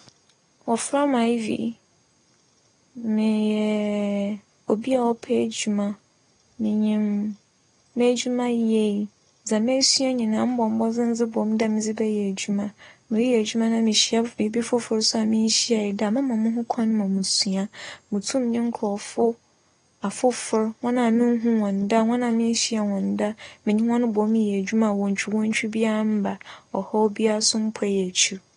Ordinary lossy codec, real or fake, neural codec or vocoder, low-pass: AAC, 32 kbps; real; none; 9.9 kHz